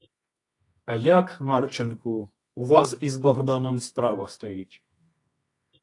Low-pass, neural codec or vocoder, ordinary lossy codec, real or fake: 10.8 kHz; codec, 24 kHz, 0.9 kbps, WavTokenizer, medium music audio release; AAC, 48 kbps; fake